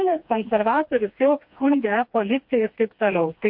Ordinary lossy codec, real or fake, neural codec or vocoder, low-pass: MP3, 48 kbps; fake; codec, 16 kHz, 2 kbps, FreqCodec, smaller model; 5.4 kHz